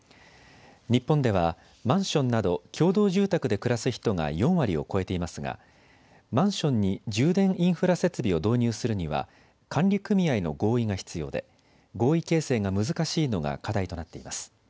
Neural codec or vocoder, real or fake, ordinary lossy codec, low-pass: none; real; none; none